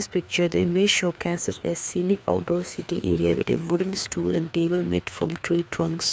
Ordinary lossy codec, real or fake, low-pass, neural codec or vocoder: none; fake; none; codec, 16 kHz, 2 kbps, FreqCodec, larger model